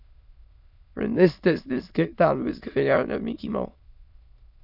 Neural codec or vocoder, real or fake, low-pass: autoencoder, 22.05 kHz, a latent of 192 numbers a frame, VITS, trained on many speakers; fake; 5.4 kHz